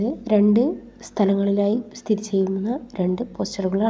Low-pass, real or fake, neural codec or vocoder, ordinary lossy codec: none; real; none; none